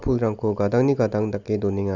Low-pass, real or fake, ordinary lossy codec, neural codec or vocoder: 7.2 kHz; real; none; none